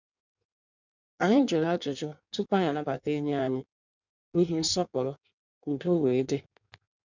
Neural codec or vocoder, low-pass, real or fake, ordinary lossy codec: codec, 16 kHz in and 24 kHz out, 1.1 kbps, FireRedTTS-2 codec; 7.2 kHz; fake; none